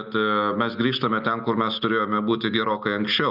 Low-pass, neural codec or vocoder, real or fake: 5.4 kHz; none; real